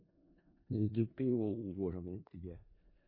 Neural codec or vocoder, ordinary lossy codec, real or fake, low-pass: codec, 16 kHz in and 24 kHz out, 0.4 kbps, LongCat-Audio-Codec, four codebook decoder; MP3, 24 kbps; fake; 5.4 kHz